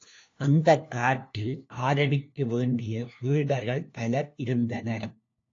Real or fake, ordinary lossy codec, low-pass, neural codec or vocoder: fake; AAC, 48 kbps; 7.2 kHz; codec, 16 kHz, 1 kbps, FunCodec, trained on LibriTTS, 50 frames a second